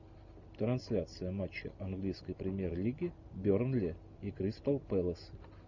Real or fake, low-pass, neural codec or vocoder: real; 7.2 kHz; none